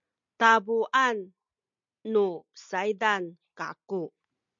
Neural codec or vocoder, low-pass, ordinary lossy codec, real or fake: none; 7.2 kHz; MP3, 64 kbps; real